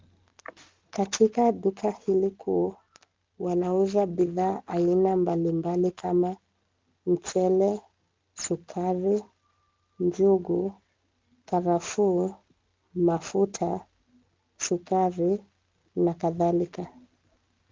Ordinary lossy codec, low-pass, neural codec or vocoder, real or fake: Opus, 32 kbps; 7.2 kHz; none; real